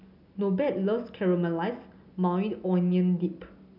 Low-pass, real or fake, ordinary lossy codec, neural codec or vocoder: 5.4 kHz; real; none; none